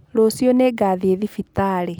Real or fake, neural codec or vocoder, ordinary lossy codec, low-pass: real; none; none; none